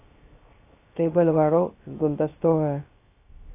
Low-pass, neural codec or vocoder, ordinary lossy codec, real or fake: 3.6 kHz; codec, 16 kHz, 0.3 kbps, FocalCodec; AAC, 24 kbps; fake